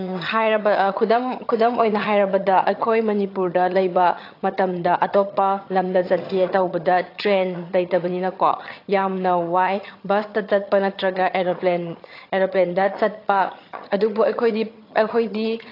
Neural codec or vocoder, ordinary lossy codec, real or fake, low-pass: vocoder, 22.05 kHz, 80 mel bands, HiFi-GAN; AAC, 32 kbps; fake; 5.4 kHz